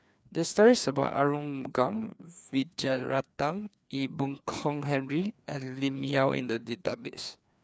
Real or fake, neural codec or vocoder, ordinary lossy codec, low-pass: fake; codec, 16 kHz, 2 kbps, FreqCodec, larger model; none; none